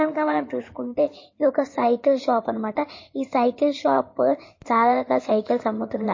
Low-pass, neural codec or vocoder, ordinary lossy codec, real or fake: 7.2 kHz; codec, 16 kHz in and 24 kHz out, 2.2 kbps, FireRedTTS-2 codec; MP3, 32 kbps; fake